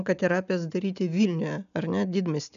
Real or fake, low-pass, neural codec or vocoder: real; 7.2 kHz; none